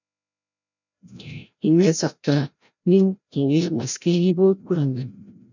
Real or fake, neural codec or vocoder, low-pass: fake; codec, 16 kHz, 0.5 kbps, FreqCodec, larger model; 7.2 kHz